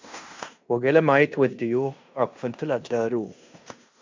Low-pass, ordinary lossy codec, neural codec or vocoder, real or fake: 7.2 kHz; MP3, 64 kbps; codec, 16 kHz in and 24 kHz out, 0.9 kbps, LongCat-Audio-Codec, fine tuned four codebook decoder; fake